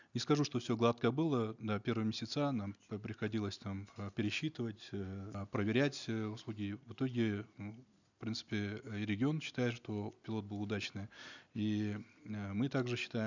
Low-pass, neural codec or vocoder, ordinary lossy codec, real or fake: 7.2 kHz; none; none; real